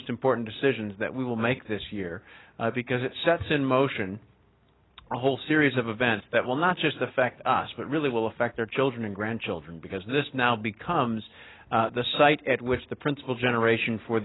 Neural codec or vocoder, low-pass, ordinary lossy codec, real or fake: none; 7.2 kHz; AAC, 16 kbps; real